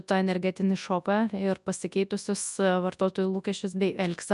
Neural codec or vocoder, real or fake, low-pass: codec, 24 kHz, 0.9 kbps, WavTokenizer, large speech release; fake; 10.8 kHz